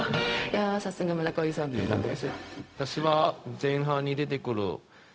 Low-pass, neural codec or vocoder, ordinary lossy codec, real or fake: none; codec, 16 kHz, 0.4 kbps, LongCat-Audio-Codec; none; fake